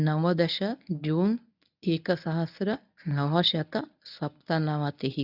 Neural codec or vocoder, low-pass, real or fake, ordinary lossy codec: codec, 24 kHz, 0.9 kbps, WavTokenizer, medium speech release version 1; 5.4 kHz; fake; none